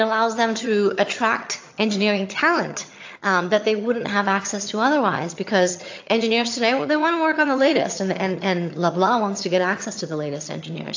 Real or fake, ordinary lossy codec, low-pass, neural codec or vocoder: fake; AAC, 48 kbps; 7.2 kHz; vocoder, 22.05 kHz, 80 mel bands, HiFi-GAN